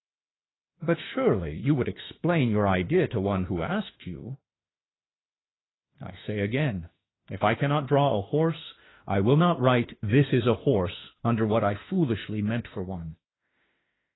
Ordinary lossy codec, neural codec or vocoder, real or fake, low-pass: AAC, 16 kbps; autoencoder, 48 kHz, 32 numbers a frame, DAC-VAE, trained on Japanese speech; fake; 7.2 kHz